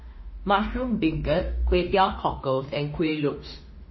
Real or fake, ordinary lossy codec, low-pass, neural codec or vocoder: fake; MP3, 24 kbps; 7.2 kHz; autoencoder, 48 kHz, 32 numbers a frame, DAC-VAE, trained on Japanese speech